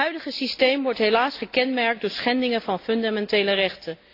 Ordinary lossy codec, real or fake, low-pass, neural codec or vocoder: AAC, 32 kbps; real; 5.4 kHz; none